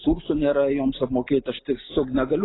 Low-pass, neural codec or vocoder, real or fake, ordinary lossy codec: 7.2 kHz; none; real; AAC, 16 kbps